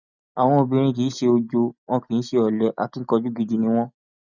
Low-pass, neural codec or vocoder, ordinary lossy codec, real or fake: 7.2 kHz; none; none; real